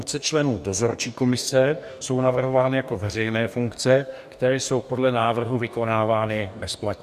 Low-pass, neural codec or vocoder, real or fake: 14.4 kHz; codec, 44.1 kHz, 2.6 kbps, DAC; fake